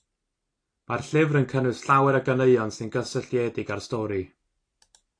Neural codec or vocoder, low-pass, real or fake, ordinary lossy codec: none; 9.9 kHz; real; AAC, 48 kbps